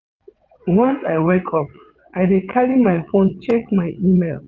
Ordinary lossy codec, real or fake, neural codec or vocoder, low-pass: none; fake; vocoder, 22.05 kHz, 80 mel bands, WaveNeXt; 7.2 kHz